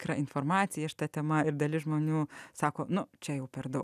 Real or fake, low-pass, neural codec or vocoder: real; 14.4 kHz; none